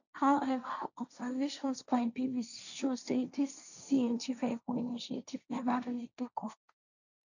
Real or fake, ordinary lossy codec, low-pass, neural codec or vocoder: fake; none; 7.2 kHz; codec, 16 kHz, 1.1 kbps, Voila-Tokenizer